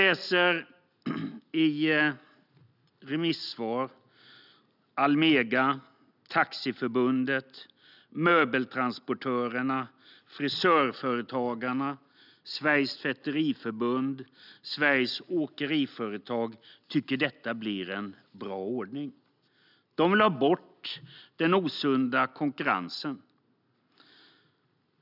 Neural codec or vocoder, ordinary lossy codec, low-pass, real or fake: none; none; 5.4 kHz; real